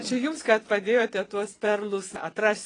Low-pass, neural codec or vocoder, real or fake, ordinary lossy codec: 9.9 kHz; vocoder, 22.05 kHz, 80 mel bands, WaveNeXt; fake; AAC, 32 kbps